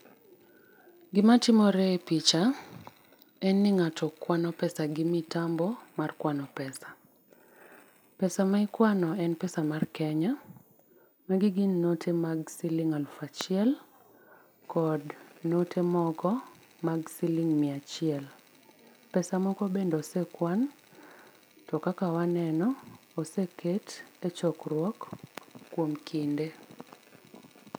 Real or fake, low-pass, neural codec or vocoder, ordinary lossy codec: real; 19.8 kHz; none; none